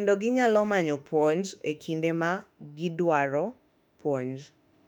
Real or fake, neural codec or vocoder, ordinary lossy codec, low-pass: fake; autoencoder, 48 kHz, 32 numbers a frame, DAC-VAE, trained on Japanese speech; none; 19.8 kHz